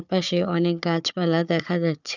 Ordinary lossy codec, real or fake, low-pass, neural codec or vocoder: none; fake; 7.2 kHz; codec, 16 kHz, 4 kbps, FunCodec, trained on Chinese and English, 50 frames a second